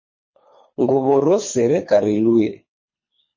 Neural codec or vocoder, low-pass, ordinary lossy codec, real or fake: codec, 24 kHz, 3 kbps, HILCodec; 7.2 kHz; MP3, 32 kbps; fake